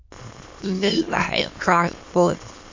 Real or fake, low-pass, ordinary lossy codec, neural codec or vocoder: fake; 7.2 kHz; MP3, 48 kbps; autoencoder, 22.05 kHz, a latent of 192 numbers a frame, VITS, trained on many speakers